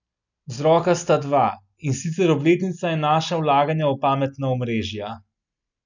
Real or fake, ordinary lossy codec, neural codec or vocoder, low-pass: real; none; none; 7.2 kHz